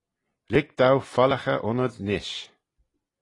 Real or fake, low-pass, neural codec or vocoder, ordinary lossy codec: real; 10.8 kHz; none; AAC, 32 kbps